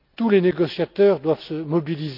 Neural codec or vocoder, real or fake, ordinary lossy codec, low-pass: none; real; none; 5.4 kHz